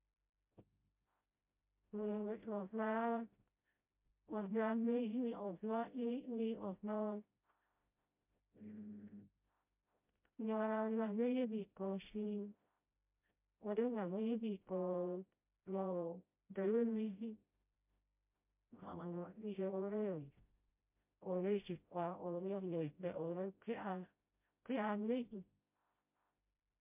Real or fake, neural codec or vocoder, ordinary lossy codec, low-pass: fake; codec, 16 kHz, 0.5 kbps, FreqCodec, smaller model; MP3, 32 kbps; 3.6 kHz